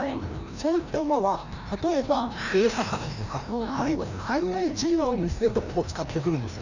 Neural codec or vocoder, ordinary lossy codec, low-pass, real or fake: codec, 16 kHz, 1 kbps, FreqCodec, larger model; none; 7.2 kHz; fake